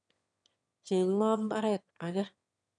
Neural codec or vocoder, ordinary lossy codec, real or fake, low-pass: autoencoder, 22.05 kHz, a latent of 192 numbers a frame, VITS, trained on one speaker; none; fake; 9.9 kHz